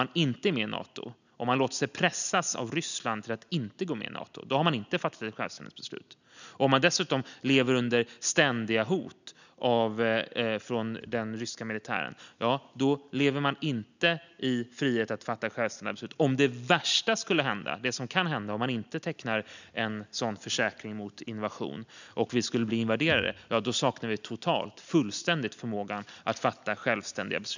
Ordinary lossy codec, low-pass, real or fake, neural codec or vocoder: none; 7.2 kHz; real; none